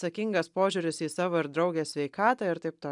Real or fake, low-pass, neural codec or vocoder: real; 10.8 kHz; none